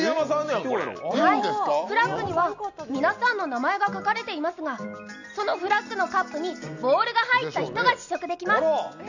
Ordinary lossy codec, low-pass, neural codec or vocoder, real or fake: none; 7.2 kHz; none; real